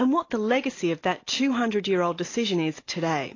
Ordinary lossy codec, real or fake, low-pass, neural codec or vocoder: AAC, 32 kbps; real; 7.2 kHz; none